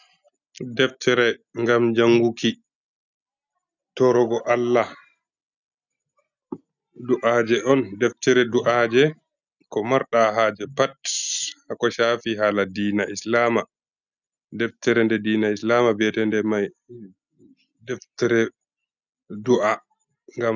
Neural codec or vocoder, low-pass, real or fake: none; 7.2 kHz; real